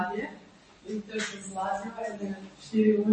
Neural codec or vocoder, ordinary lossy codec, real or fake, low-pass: none; MP3, 32 kbps; real; 10.8 kHz